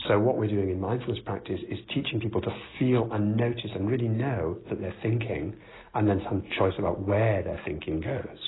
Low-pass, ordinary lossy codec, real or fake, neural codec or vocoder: 7.2 kHz; AAC, 16 kbps; real; none